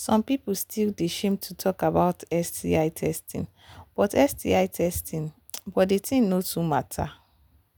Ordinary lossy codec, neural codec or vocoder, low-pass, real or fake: none; none; none; real